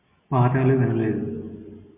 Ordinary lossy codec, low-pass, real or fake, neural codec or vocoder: MP3, 24 kbps; 3.6 kHz; real; none